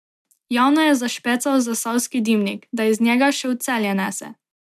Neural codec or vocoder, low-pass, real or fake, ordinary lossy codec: none; 14.4 kHz; real; MP3, 96 kbps